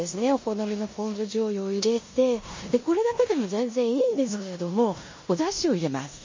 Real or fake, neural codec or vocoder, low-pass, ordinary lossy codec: fake; codec, 16 kHz in and 24 kHz out, 0.9 kbps, LongCat-Audio-Codec, four codebook decoder; 7.2 kHz; MP3, 32 kbps